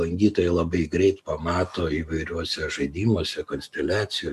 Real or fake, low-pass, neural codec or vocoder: real; 14.4 kHz; none